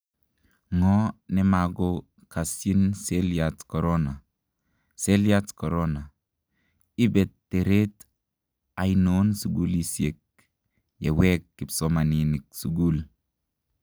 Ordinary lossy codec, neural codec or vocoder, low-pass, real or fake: none; none; none; real